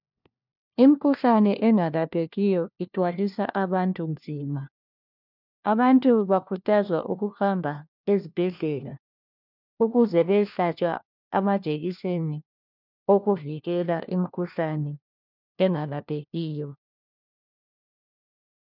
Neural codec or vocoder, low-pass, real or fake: codec, 16 kHz, 1 kbps, FunCodec, trained on LibriTTS, 50 frames a second; 5.4 kHz; fake